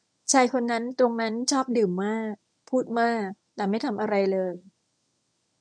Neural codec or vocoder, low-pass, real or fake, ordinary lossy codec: codec, 24 kHz, 0.9 kbps, WavTokenizer, medium speech release version 1; 9.9 kHz; fake; MP3, 96 kbps